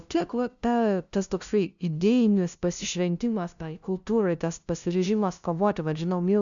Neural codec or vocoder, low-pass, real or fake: codec, 16 kHz, 0.5 kbps, FunCodec, trained on LibriTTS, 25 frames a second; 7.2 kHz; fake